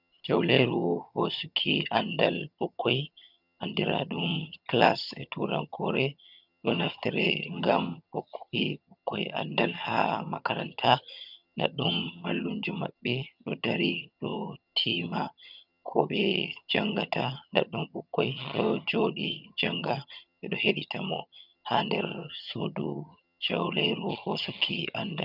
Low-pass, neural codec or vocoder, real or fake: 5.4 kHz; vocoder, 22.05 kHz, 80 mel bands, HiFi-GAN; fake